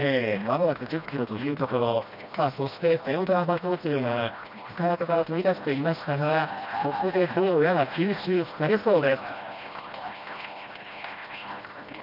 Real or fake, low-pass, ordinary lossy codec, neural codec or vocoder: fake; 5.4 kHz; none; codec, 16 kHz, 1 kbps, FreqCodec, smaller model